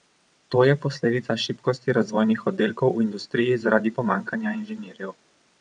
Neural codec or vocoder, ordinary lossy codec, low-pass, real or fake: vocoder, 22.05 kHz, 80 mel bands, WaveNeXt; none; 9.9 kHz; fake